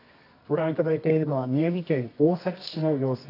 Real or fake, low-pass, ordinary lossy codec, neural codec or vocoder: fake; 5.4 kHz; AAC, 32 kbps; codec, 24 kHz, 0.9 kbps, WavTokenizer, medium music audio release